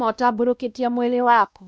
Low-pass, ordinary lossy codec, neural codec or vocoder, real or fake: none; none; codec, 16 kHz, 1 kbps, X-Codec, WavLM features, trained on Multilingual LibriSpeech; fake